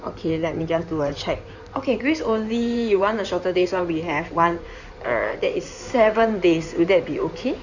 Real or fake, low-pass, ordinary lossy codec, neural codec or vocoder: fake; 7.2 kHz; none; codec, 16 kHz in and 24 kHz out, 2.2 kbps, FireRedTTS-2 codec